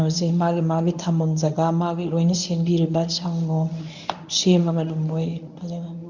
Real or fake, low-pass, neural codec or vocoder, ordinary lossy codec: fake; 7.2 kHz; codec, 24 kHz, 0.9 kbps, WavTokenizer, medium speech release version 1; none